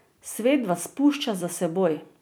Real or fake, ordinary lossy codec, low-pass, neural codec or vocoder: real; none; none; none